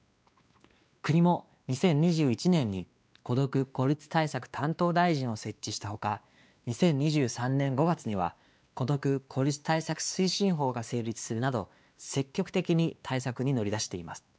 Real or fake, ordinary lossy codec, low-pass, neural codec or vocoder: fake; none; none; codec, 16 kHz, 2 kbps, X-Codec, WavLM features, trained on Multilingual LibriSpeech